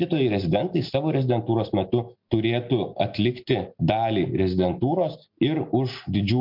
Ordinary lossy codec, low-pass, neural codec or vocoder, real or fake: MP3, 48 kbps; 5.4 kHz; none; real